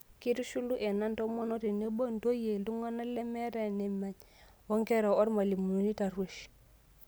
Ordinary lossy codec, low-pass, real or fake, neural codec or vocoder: none; none; real; none